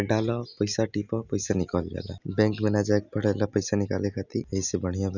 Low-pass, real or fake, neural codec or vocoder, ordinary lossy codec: 7.2 kHz; real; none; none